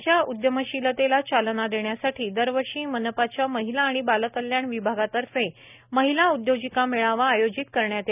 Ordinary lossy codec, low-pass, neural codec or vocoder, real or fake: none; 3.6 kHz; none; real